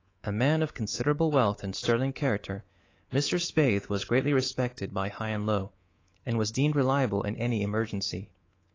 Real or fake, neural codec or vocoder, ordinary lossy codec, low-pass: fake; codec, 24 kHz, 3.1 kbps, DualCodec; AAC, 32 kbps; 7.2 kHz